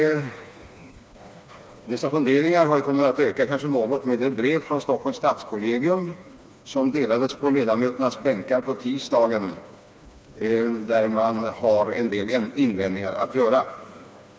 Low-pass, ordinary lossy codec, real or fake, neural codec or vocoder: none; none; fake; codec, 16 kHz, 2 kbps, FreqCodec, smaller model